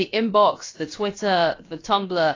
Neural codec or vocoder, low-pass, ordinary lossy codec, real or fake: codec, 16 kHz, about 1 kbps, DyCAST, with the encoder's durations; 7.2 kHz; AAC, 32 kbps; fake